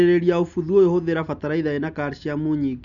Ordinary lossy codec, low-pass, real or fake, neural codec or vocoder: none; 7.2 kHz; real; none